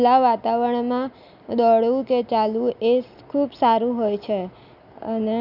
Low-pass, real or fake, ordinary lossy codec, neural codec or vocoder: 5.4 kHz; real; none; none